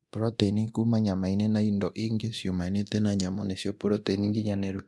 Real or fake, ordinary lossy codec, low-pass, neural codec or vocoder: fake; none; none; codec, 24 kHz, 0.9 kbps, DualCodec